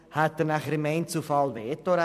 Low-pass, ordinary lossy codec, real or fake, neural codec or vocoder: 14.4 kHz; MP3, 96 kbps; fake; vocoder, 44.1 kHz, 128 mel bands every 256 samples, BigVGAN v2